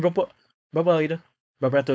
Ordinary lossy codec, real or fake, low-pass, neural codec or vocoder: none; fake; none; codec, 16 kHz, 4.8 kbps, FACodec